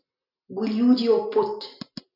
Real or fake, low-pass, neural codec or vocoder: real; 5.4 kHz; none